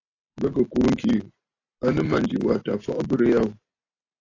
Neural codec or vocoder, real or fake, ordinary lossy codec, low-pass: none; real; AAC, 32 kbps; 7.2 kHz